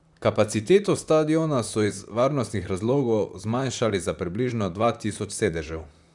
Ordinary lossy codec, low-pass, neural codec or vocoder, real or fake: none; 10.8 kHz; vocoder, 44.1 kHz, 128 mel bands, Pupu-Vocoder; fake